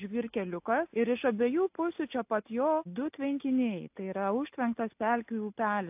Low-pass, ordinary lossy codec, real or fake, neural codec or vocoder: 3.6 kHz; AAC, 32 kbps; real; none